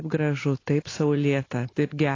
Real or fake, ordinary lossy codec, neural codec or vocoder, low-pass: real; AAC, 32 kbps; none; 7.2 kHz